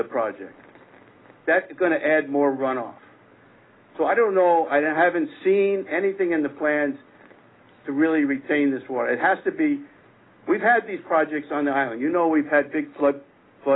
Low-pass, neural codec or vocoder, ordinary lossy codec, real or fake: 7.2 kHz; none; AAC, 16 kbps; real